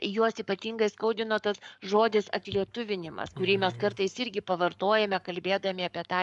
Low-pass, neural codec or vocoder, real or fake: 10.8 kHz; codec, 44.1 kHz, 7.8 kbps, Pupu-Codec; fake